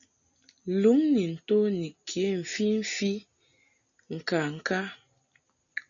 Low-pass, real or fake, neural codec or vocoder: 7.2 kHz; real; none